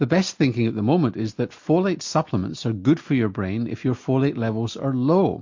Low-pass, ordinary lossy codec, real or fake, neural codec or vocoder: 7.2 kHz; MP3, 48 kbps; real; none